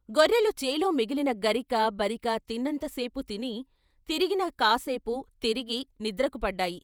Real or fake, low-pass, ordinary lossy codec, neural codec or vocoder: fake; none; none; vocoder, 48 kHz, 128 mel bands, Vocos